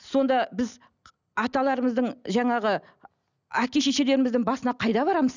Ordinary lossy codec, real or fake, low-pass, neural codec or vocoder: none; real; 7.2 kHz; none